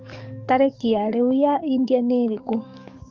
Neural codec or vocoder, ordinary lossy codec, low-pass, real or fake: codec, 44.1 kHz, 7.8 kbps, DAC; Opus, 24 kbps; 7.2 kHz; fake